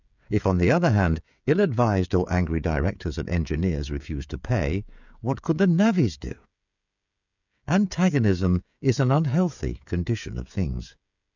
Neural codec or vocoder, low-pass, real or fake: codec, 16 kHz, 16 kbps, FreqCodec, smaller model; 7.2 kHz; fake